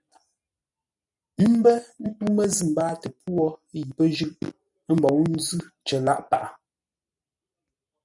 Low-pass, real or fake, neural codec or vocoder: 10.8 kHz; real; none